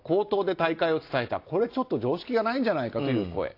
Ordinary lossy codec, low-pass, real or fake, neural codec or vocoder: AAC, 32 kbps; 5.4 kHz; real; none